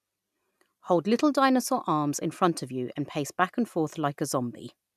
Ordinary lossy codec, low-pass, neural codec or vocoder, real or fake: none; 14.4 kHz; none; real